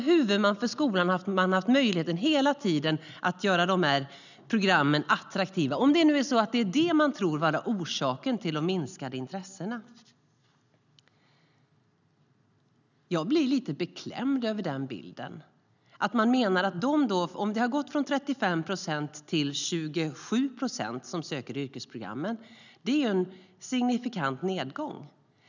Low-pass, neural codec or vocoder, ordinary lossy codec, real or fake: 7.2 kHz; none; none; real